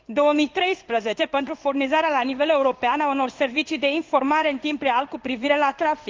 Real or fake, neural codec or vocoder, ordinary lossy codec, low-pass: fake; codec, 16 kHz in and 24 kHz out, 1 kbps, XY-Tokenizer; Opus, 24 kbps; 7.2 kHz